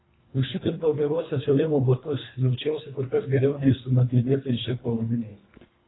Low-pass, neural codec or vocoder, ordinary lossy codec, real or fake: 7.2 kHz; codec, 24 kHz, 1.5 kbps, HILCodec; AAC, 16 kbps; fake